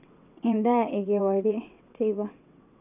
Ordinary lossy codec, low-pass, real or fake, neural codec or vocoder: none; 3.6 kHz; fake; vocoder, 22.05 kHz, 80 mel bands, WaveNeXt